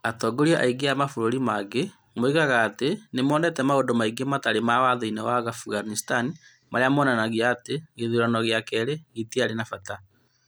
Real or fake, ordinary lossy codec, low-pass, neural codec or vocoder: real; none; none; none